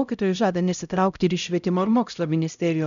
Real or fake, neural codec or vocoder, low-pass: fake; codec, 16 kHz, 0.5 kbps, X-Codec, HuBERT features, trained on LibriSpeech; 7.2 kHz